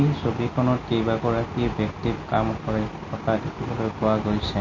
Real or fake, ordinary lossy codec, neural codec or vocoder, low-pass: real; MP3, 32 kbps; none; 7.2 kHz